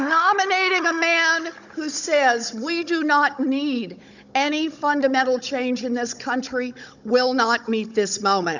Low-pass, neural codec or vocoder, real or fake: 7.2 kHz; codec, 16 kHz, 16 kbps, FunCodec, trained on Chinese and English, 50 frames a second; fake